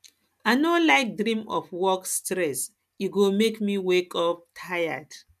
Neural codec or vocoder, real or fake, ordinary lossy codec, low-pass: none; real; none; 14.4 kHz